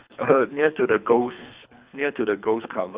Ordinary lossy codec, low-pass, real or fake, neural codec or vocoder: Opus, 64 kbps; 3.6 kHz; fake; codec, 24 kHz, 3 kbps, HILCodec